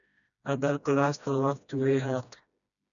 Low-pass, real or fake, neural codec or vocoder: 7.2 kHz; fake; codec, 16 kHz, 1 kbps, FreqCodec, smaller model